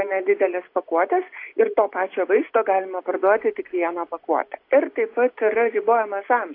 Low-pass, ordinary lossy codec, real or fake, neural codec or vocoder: 5.4 kHz; AAC, 32 kbps; real; none